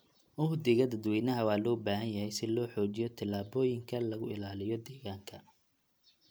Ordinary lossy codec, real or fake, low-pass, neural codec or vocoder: none; real; none; none